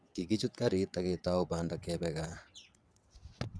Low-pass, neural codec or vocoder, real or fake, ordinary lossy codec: none; vocoder, 22.05 kHz, 80 mel bands, WaveNeXt; fake; none